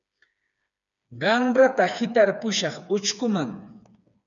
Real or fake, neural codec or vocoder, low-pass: fake; codec, 16 kHz, 4 kbps, FreqCodec, smaller model; 7.2 kHz